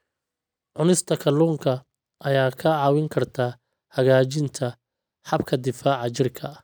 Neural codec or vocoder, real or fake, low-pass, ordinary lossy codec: none; real; none; none